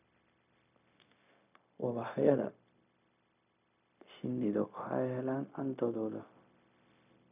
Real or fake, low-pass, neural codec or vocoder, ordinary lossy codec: fake; 3.6 kHz; codec, 16 kHz, 0.4 kbps, LongCat-Audio-Codec; none